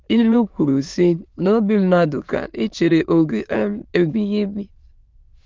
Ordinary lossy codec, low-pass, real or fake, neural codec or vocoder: Opus, 32 kbps; 7.2 kHz; fake; autoencoder, 22.05 kHz, a latent of 192 numbers a frame, VITS, trained on many speakers